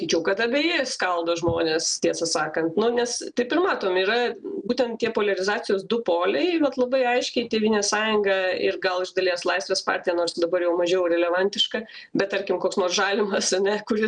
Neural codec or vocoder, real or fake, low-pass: none; real; 9.9 kHz